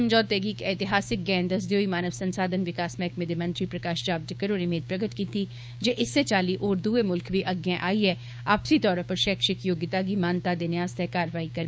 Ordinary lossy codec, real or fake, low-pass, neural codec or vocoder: none; fake; none; codec, 16 kHz, 6 kbps, DAC